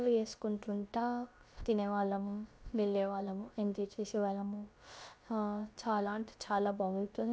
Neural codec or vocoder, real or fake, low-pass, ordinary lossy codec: codec, 16 kHz, about 1 kbps, DyCAST, with the encoder's durations; fake; none; none